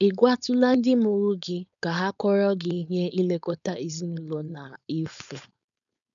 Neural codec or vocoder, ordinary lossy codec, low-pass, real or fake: codec, 16 kHz, 4.8 kbps, FACodec; none; 7.2 kHz; fake